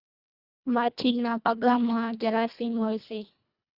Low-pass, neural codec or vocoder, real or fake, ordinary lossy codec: 5.4 kHz; codec, 24 kHz, 1.5 kbps, HILCodec; fake; Opus, 64 kbps